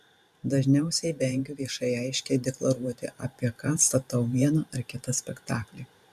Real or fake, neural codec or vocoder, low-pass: fake; vocoder, 44.1 kHz, 128 mel bands every 512 samples, BigVGAN v2; 14.4 kHz